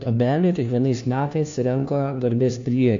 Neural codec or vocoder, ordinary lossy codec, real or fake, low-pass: codec, 16 kHz, 1 kbps, FunCodec, trained on LibriTTS, 50 frames a second; AAC, 64 kbps; fake; 7.2 kHz